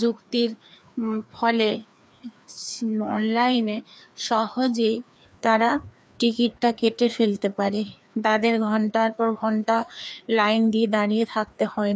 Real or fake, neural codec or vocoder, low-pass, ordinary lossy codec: fake; codec, 16 kHz, 2 kbps, FreqCodec, larger model; none; none